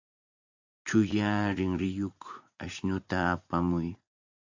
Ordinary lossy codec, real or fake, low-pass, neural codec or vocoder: AAC, 48 kbps; fake; 7.2 kHz; vocoder, 44.1 kHz, 80 mel bands, Vocos